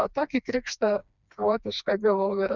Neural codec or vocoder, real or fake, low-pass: codec, 16 kHz, 2 kbps, FreqCodec, smaller model; fake; 7.2 kHz